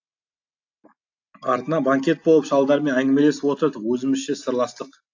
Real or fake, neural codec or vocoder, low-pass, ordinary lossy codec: real; none; 7.2 kHz; AAC, 48 kbps